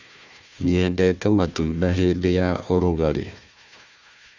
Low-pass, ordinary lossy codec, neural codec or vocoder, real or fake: 7.2 kHz; none; codec, 16 kHz, 1 kbps, FunCodec, trained on Chinese and English, 50 frames a second; fake